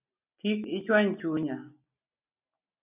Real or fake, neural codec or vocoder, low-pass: real; none; 3.6 kHz